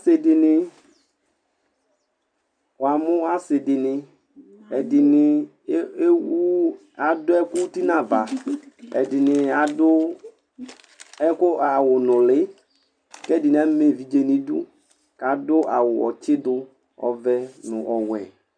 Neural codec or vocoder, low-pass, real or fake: none; 9.9 kHz; real